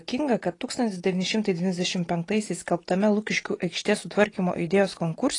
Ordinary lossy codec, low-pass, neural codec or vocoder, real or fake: AAC, 32 kbps; 10.8 kHz; none; real